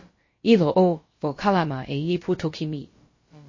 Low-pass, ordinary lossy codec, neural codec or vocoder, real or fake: 7.2 kHz; MP3, 32 kbps; codec, 16 kHz, about 1 kbps, DyCAST, with the encoder's durations; fake